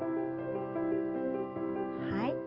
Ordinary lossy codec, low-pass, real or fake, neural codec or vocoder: Opus, 24 kbps; 5.4 kHz; real; none